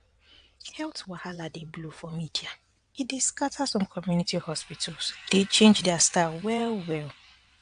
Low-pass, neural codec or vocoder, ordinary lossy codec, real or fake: 9.9 kHz; vocoder, 22.05 kHz, 80 mel bands, WaveNeXt; AAC, 64 kbps; fake